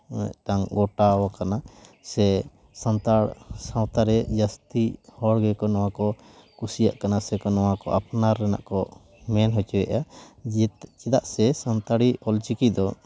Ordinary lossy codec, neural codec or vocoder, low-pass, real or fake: none; none; none; real